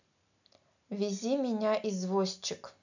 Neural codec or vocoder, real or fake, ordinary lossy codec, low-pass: none; real; none; 7.2 kHz